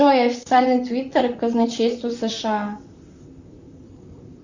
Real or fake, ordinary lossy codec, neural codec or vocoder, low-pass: fake; Opus, 64 kbps; vocoder, 44.1 kHz, 128 mel bands, Pupu-Vocoder; 7.2 kHz